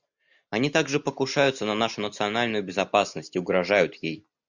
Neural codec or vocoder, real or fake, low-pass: none; real; 7.2 kHz